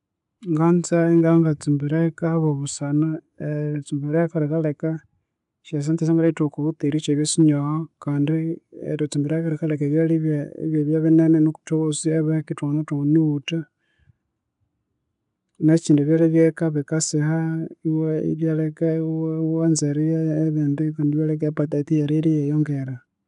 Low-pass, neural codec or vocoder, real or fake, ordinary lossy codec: 10.8 kHz; none; real; none